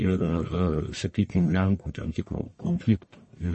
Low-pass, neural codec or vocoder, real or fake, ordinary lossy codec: 10.8 kHz; codec, 44.1 kHz, 1.7 kbps, Pupu-Codec; fake; MP3, 32 kbps